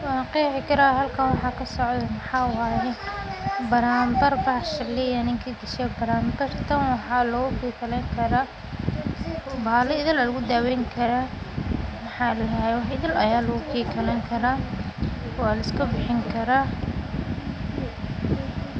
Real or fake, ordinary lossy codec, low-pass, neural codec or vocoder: real; none; none; none